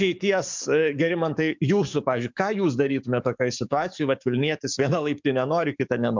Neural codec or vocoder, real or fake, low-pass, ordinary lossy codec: codec, 44.1 kHz, 7.8 kbps, DAC; fake; 7.2 kHz; MP3, 64 kbps